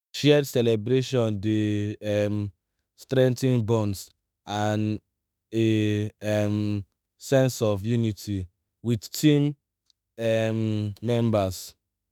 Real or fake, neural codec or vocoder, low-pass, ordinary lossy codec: fake; autoencoder, 48 kHz, 32 numbers a frame, DAC-VAE, trained on Japanese speech; none; none